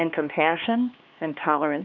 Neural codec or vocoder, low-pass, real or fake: codec, 16 kHz, 2 kbps, X-Codec, HuBERT features, trained on LibriSpeech; 7.2 kHz; fake